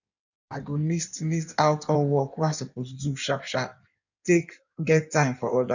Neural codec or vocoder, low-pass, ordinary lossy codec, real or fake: codec, 16 kHz in and 24 kHz out, 1.1 kbps, FireRedTTS-2 codec; 7.2 kHz; none; fake